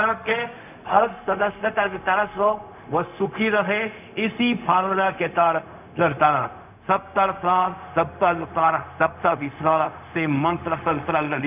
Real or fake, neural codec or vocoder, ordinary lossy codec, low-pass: fake; codec, 16 kHz, 0.4 kbps, LongCat-Audio-Codec; none; 3.6 kHz